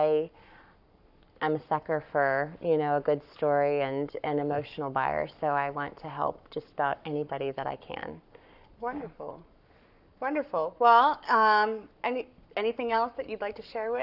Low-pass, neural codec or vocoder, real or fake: 5.4 kHz; codec, 44.1 kHz, 7.8 kbps, Pupu-Codec; fake